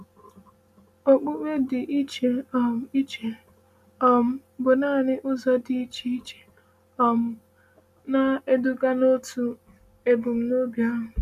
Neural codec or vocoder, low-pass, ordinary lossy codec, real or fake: none; 14.4 kHz; MP3, 96 kbps; real